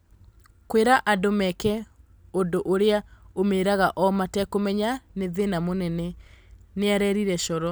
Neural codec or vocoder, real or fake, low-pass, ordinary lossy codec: none; real; none; none